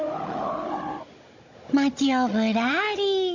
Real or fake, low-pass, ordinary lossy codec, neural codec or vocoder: fake; 7.2 kHz; AAC, 48 kbps; codec, 16 kHz, 16 kbps, FunCodec, trained on Chinese and English, 50 frames a second